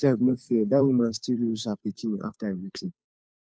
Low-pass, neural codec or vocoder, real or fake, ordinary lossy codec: none; codec, 16 kHz, 2 kbps, FunCodec, trained on Chinese and English, 25 frames a second; fake; none